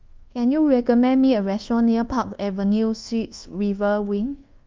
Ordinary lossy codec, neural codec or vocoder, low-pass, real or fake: Opus, 24 kbps; codec, 24 kHz, 1.2 kbps, DualCodec; 7.2 kHz; fake